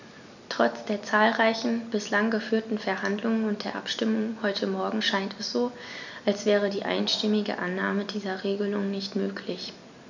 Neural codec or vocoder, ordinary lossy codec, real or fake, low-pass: none; none; real; 7.2 kHz